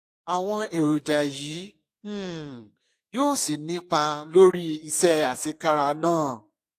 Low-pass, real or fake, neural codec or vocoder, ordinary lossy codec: 14.4 kHz; fake; codec, 32 kHz, 1.9 kbps, SNAC; AAC, 64 kbps